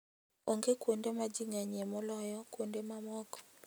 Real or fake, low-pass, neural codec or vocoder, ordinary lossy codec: real; none; none; none